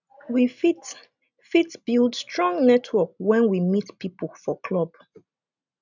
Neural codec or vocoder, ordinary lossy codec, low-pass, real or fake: none; none; 7.2 kHz; real